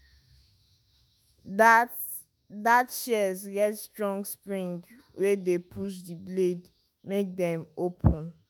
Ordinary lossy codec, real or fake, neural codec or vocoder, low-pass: none; fake; autoencoder, 48 kHz, 32 numbers a frame, DAC-VAE, trained on Japanese speech; none